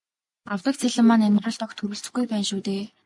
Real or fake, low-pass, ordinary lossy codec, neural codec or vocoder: real; 10.8 kHz; MP3, 96 kbps; none